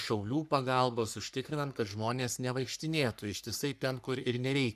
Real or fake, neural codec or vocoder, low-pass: fake; codec, 44.1 kHz, 3.4 kbps, Pupu-Codec; 14.4 kHz